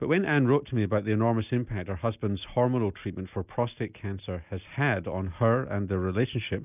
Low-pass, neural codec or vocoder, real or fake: 3.6 kHz; none; real